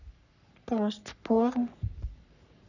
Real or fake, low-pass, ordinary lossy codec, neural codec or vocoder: fake; 7.2 kHz; none; codec, 44.1 kHz, 3.4 kbps, Pupu-Codec